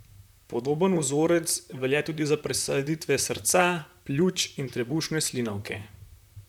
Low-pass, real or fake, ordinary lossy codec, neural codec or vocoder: 19.8 kHz; fake; none; vocoder, 44.1 kHz, 128 mel bands, Pupu-Vocoder